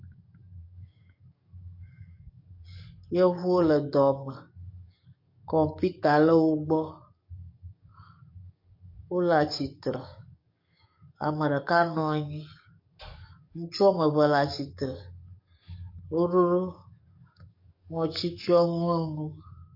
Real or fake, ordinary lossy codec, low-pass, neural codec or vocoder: fake; MP3, 32 kbps; 5.4 kHz; codec, 44.1 kHz, 7.8 kbps, DAC